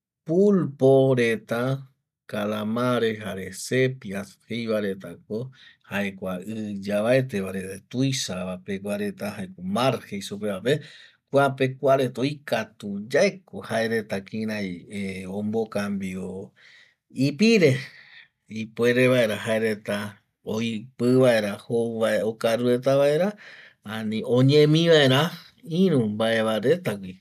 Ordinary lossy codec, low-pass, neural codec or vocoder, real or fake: none; 14.4 kHz; none; real